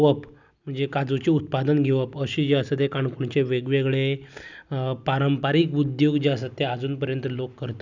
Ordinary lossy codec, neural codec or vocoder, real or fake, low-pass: none; none; real; 7.2 kHz